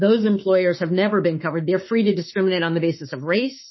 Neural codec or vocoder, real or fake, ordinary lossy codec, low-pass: autoencoder, 48 kHz, 32 numbers a frame, DAC-VAE, trained on Japanese speech; fake; MP3, 24 kbps; 7.2 kHz